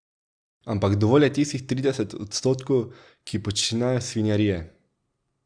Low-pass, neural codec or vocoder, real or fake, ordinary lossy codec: 9.9 kHz; none; real; Opus, 64 kbps